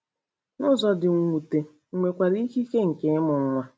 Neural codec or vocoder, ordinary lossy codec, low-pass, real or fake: none; none; none; real